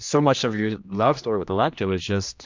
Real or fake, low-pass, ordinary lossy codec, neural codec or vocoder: fake; 7.2 kHz; MP3, 64 kbps; codec, 16 kHz, 1 kbps, X-Codec, HuBERT features, trained on general audio